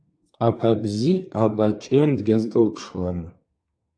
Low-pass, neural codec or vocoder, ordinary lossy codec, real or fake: 9.9 kHz; codec, 24 kHz, 1 kbps, SNAC; AAC, 64 kbps; fake